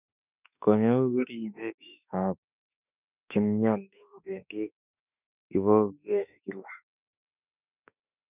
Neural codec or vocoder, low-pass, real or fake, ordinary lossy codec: autoencoder, 48 kHz, 32 numbers a frame, DAC-VAE, trained on Japanese speech; 3.6 kHz; fake; none